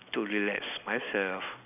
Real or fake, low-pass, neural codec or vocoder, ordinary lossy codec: real; 3.6 kHz; none; none